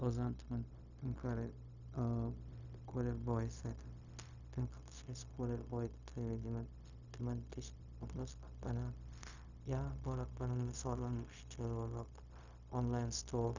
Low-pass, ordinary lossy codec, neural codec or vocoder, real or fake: 7.2 kHz; none; codec, 16 kHz, 0.4 kbps, LongCat-Audio-Codec; fake